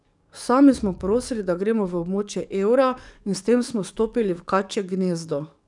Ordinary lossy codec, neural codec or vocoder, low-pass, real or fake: none; codec, 44.1 kHz, 7.8 kbps, DAC; 10.8 kHz; fake